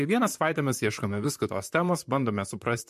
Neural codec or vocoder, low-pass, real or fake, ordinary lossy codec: vocoder, 44.1 kHz, 128 mel bands, Pupu-Vocoder; 14.4 kHz; fake; MP3, 64 kbps